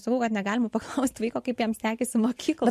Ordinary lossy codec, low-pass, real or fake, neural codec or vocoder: MP3, 64 kbps; 14.4 kHz; real; none